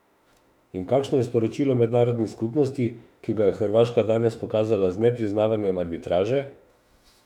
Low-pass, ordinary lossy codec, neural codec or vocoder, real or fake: 19.8 kHz; none; autoencoder, 48 kHz, 32 numbers a frame, DAC-VAE, trained on Japanese speech; fake